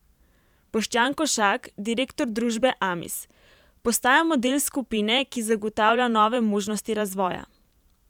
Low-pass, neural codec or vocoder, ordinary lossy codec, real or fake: 19.8 kHz; vocoder, 44.1 kHz, 128 mel bands every 512 samples, BigVGAN v2; none; fake